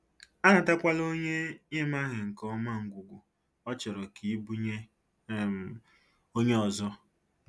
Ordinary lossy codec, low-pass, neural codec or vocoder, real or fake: none; none; none; real